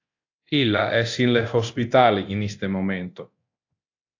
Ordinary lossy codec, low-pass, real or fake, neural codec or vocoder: AAC, 48 kbps; 7.2 kHz; fake; codec, 24 kHz, 0.9 kbps, DualCodec